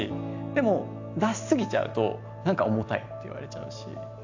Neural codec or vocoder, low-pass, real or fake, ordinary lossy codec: none; 7.2 kHz; real; AAC, 48 kbps